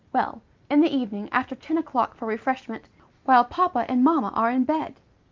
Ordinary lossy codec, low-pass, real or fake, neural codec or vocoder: Opus, 24 kbps; 7.2 kHz; real; none